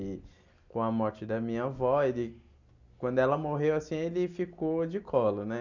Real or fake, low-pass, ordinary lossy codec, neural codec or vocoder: real; 7.2 kHz; none; none